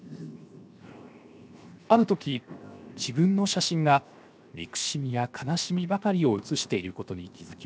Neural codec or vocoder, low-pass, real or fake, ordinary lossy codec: codec, 16 kHz, 0.7 kbps, FocalCodec; none; fake; none